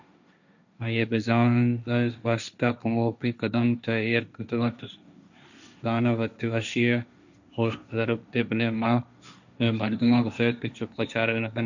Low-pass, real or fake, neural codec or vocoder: 7.2 kHz; fake; codec, 16 kHz, 1.1 kbps, Voila-Tokenizer